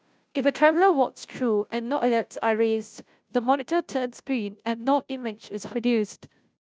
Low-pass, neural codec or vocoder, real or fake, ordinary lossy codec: none; codec, 16 kHz, 0.5 kbps, FunCodec, trained on Chinese and English, 25 frames a second; fake; none